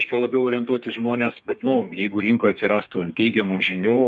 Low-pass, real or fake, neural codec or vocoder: 10.8 kHz; fake; codec, 32 kHz, 1.9 kbps, SNAC